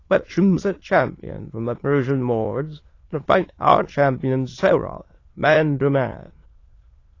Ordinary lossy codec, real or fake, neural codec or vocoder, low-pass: AAC, 32 kbps; fake; autoencoder, 22.05 kHz, a latent of 192 numbers a frame, VITS, trained on many speakers; 7.2 kHz